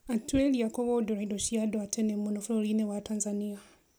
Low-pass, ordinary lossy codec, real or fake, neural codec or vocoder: none; none; real; none